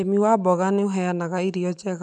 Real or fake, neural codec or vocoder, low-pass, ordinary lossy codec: real; none; 10.8 kHz; none